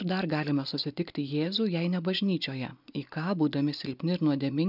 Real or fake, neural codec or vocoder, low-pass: real; none; 5.4 kHz